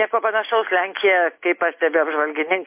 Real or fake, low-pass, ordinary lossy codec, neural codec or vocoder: real; 3.6 kHz; MP3, 24 kbps; none